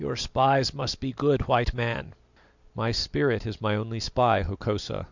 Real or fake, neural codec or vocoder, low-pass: real; none; 7.2 kHz